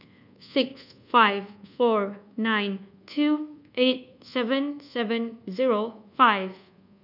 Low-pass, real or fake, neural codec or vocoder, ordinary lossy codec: 5.4 kHz; fake; codec, 24 kHz, 1.2 kbps, DualCodec; none